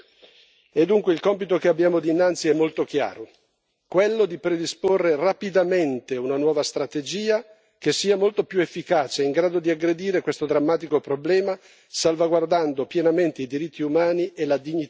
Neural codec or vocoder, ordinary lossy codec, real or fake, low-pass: none; none; real; none